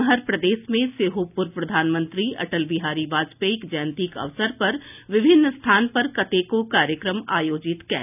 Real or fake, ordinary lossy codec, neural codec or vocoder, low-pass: real; none; none; 3.6 kHz